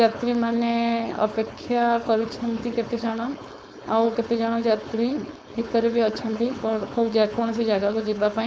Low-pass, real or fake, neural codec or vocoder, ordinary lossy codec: none; fake; codec, 16 kHz, 4.8 kbps, FACodec; none